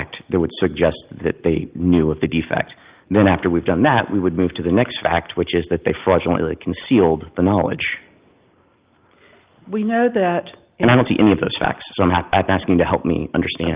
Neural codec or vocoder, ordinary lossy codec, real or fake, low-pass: none; Opus, 32 kbps; real; 3.6 kHz